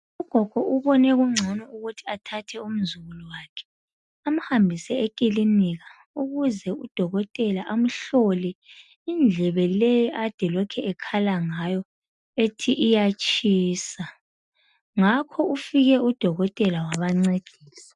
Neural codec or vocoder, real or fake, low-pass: none; real; 10.8 kHz